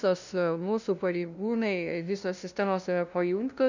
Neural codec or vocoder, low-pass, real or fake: codec, 16 kHz, 0.5 kbps, FunCodec, trained on LibriTTS, 25 frames a second; 7.2 kHz; fake